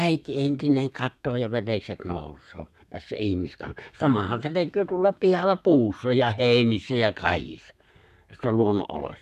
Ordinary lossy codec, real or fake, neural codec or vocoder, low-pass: none; fake; codec, 32 kHz, 1.9 kbps, SNAC; 14.4 kHz